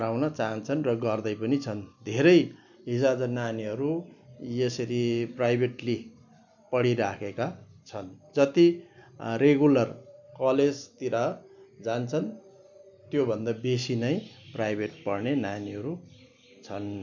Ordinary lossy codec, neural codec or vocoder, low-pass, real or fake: none; none; 7.2 kHz; real